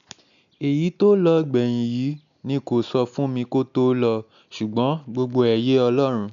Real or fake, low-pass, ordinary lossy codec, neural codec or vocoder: real; 7.2 kHz; none; none